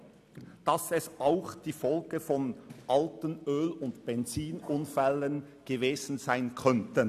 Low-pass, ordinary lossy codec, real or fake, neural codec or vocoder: 14.4 kHz; none; real; none